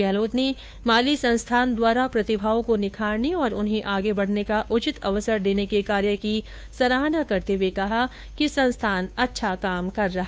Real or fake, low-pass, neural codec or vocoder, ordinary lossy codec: fake; none; codec, 16 kHz, 2 kbps, FunCodec, trained on Chinese and English, 25 frames a second; none